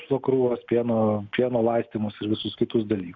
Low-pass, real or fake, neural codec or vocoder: 7.2 kHz; real; none